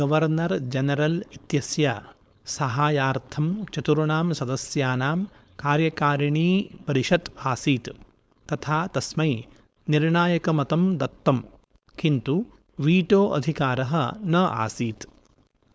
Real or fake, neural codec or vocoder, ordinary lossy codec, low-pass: fake; codec, 16 kHz, 4.8 kbps, FACodec; none; none